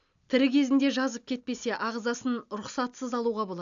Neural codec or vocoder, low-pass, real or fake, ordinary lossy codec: none; 7.2 kHz; real; AAC, 64 kbps